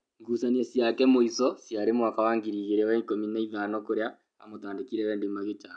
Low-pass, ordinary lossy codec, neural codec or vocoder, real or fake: 9.9 kHz; none; none; real